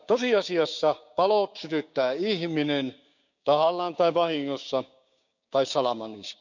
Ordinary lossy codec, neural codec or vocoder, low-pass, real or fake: none; codec, 16 kHz, 6 kbps, DAC; 7.2 kHz; fake